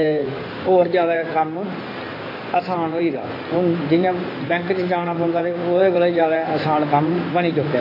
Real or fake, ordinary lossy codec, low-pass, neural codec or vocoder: fake; AAC, 24 kbps; 5.4 kHz; codec, 16 kHz in and 24 kHz out, 2.2 kbps, FireRedTTS-2 codec